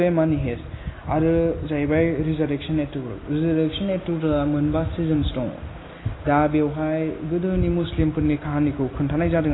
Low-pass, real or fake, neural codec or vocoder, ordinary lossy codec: 7.2 kHz; real; none; AAC, 16 kbps